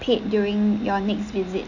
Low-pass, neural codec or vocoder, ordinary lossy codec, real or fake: 7.2 kHz; none; none; real